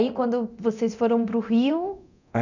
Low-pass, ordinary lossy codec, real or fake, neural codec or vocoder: 7.2 kHz; none; fake; codec, 24 kHz, 0.9 kbps, DualCodec